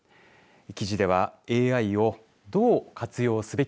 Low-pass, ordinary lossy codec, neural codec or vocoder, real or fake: none; none; none; real